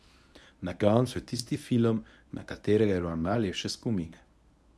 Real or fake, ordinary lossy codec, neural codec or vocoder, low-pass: fake; none; codec, 24 kHz, 0.9 kbps, WavTokenizer, medium speech release version 1; none